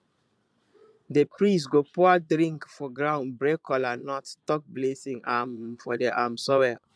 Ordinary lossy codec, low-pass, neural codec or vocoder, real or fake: none; none; vocoder, 22.05 kHz, 80 mel bands, Vocos; fake